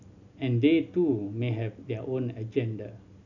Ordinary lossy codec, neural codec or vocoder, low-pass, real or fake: none; none; 7.2 kHz; real